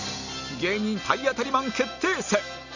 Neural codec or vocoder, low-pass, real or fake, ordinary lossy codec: none; 7.2 kHz; real; none